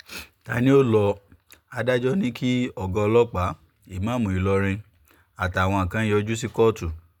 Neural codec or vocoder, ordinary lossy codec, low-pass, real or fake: none; none; 19.8 kHz; real